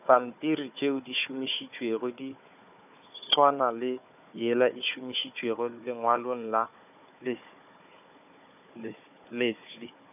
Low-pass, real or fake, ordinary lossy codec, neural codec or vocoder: 3.6 kHz; fake; none; codec, 16 kHz, 4 kbps, FunCodec, trained on Chinese and English, 50 frames a second